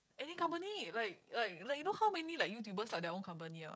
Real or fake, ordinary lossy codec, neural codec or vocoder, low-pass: fake; none; codec, 16 kHz, 16 kbps, FreqCodec, smaller model; none